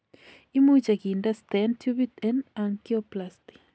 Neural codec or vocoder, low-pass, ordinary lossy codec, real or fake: none; none; none; real